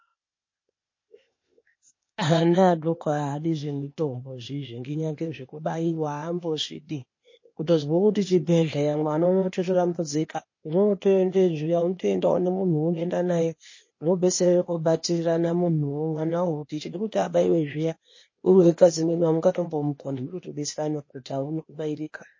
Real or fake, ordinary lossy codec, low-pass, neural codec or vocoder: fake; MP3, 32 kbps; 7.2 kHz; codec, 16 kHz, 0.8 kbps, ZipCodec